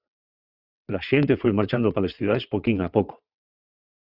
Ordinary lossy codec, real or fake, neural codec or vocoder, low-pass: Opus, 64 kbps; fake; vocoder, 22.05 kHz, 80 mel bands, WaveNeXt; 5.4 kHz